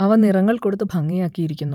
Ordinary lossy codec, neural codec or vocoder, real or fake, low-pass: none; vocoder, 44.1 kHz, 128 mel bands every 512 samples, BigVGAN v2; fake; 19.8 kHz